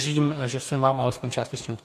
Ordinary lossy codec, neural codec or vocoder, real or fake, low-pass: AAC, 64 kbps; codec, 44.1 kHz, 2.6 kbps, DAC; fake; 14.4 kHz